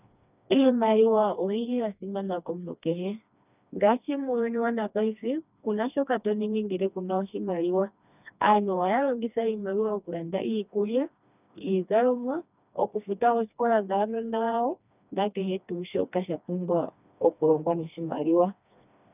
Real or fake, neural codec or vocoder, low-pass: fake; codec, 16 kHz, 2 kbps, FreqCodec, smaller model; 3.6 kHz